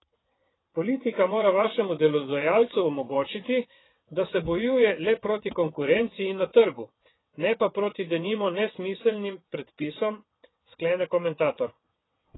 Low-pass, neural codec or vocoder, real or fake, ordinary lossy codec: 7.2 kHz; vocoder, 22.05 kHz, 80 mel bands, WaveNeXt; fake; AAC, 16 kbps